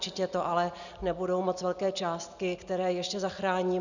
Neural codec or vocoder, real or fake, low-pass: none; real; 7.2 kHz